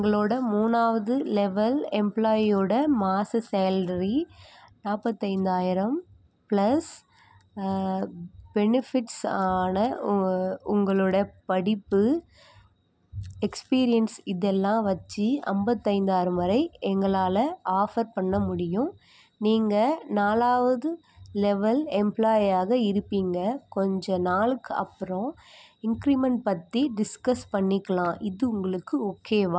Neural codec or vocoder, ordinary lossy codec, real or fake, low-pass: none; none; real; none